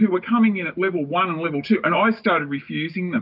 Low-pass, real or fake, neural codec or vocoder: 5.4 kHz; real; none